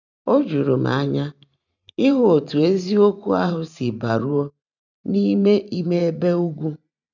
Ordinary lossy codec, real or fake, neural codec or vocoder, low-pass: none; fake; vocoder, 44.1 kHz, 128 mel bands every 512 samples, BigVGAN v2; 7.2 kHz